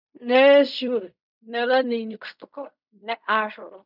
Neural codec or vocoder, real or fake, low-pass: codec, 16 kHz in and 24 kHz out, 0.4 kbps, LongCat-Audio-Codec, fine tuned four codebook decoder; fake; 5.4 kHz